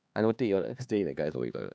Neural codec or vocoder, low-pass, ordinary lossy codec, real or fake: codec, 16 kHz, 2 kbps, X-Codec, HuBERT features, trained on LibriSpeech; none; none; fake